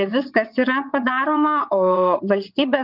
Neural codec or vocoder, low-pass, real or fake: vocoder, 22.05 kHz, 80 mel bands, WaveNeXt; 5.4 kHz; fake